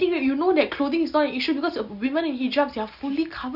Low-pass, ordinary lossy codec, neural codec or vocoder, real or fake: 5.4 kHz; Opus, 64 kbps; none; real